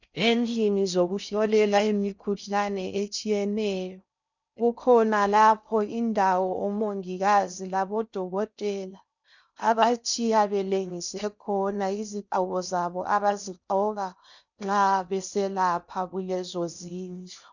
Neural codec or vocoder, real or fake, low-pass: codec, 16 kHz in and 24 kHz out, 0.6 kbps, FocalCodec, streaming, 4096 codes; fake; 7.2 kHz